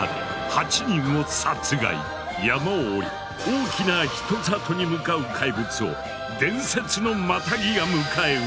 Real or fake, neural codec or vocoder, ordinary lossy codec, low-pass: real; none; none; none